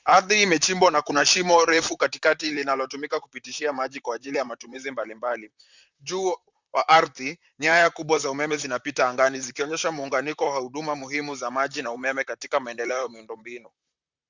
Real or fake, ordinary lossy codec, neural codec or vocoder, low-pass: fake; Opus, 64 kbps; vocoder, 44.1 kHz, 128 mel bands, Pupu-Vocoder; 7.2 kHz